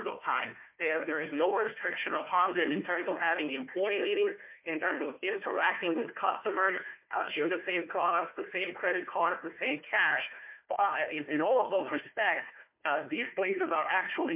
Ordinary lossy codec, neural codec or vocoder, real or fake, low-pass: MP3, 32 kbps; codec, 16 kHz, 1 kbps, FunCodec, trained on Chinese and English, 50 frames a second; fake; 3.6 kHz